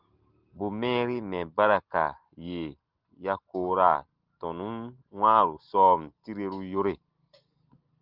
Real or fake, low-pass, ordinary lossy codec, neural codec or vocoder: real; 5.4 kHz; Opus, 32 kbps; none